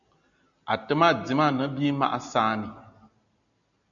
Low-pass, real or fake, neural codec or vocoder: 7.2 kHz; real; none